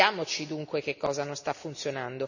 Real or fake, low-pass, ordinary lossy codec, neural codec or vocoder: real; 7.2 kHz; none; none